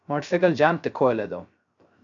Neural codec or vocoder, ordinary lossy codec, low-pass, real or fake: codec, 16 kHz, 0.3 kbps, FocalCodec; MP3, 64 kbps; 7.2 kHz; fake